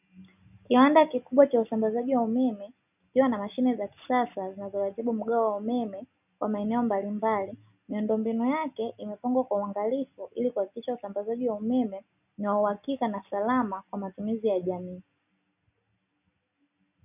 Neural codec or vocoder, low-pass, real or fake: none; 3.6 kHz; real